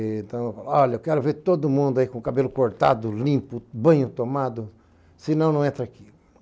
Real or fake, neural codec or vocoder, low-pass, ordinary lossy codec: real; none; none; none